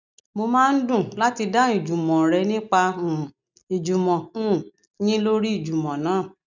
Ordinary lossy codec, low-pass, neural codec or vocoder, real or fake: none; 7.2 kHz; none; real